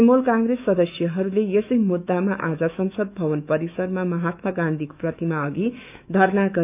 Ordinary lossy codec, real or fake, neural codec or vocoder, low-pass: none; fake; autoencoder, 48 kHz, 128 numbers a frame, DAC-VAE, trained on Japanese speech; 3.6 kHz